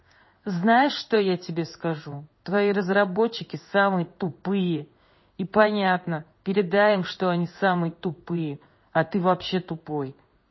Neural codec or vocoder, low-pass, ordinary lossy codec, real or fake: codec, 16 kHz in and 24 kHz out, 1 kbps, XY-Tokenizer; 7.2 kHz; MP3, 24 kbps; fake